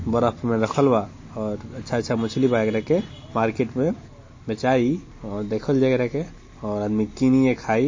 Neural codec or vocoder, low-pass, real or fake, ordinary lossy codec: none; 7.2 kHz; real; MP3, 32 kbps